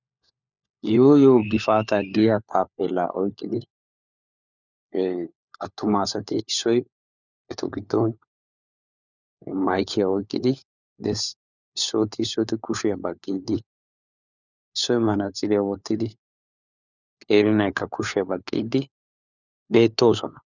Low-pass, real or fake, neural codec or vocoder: 7.2 kHz; fake; codec, 16 kHz, 4 kbps, FunCodec, trained on LibriTTS, 50 frames a second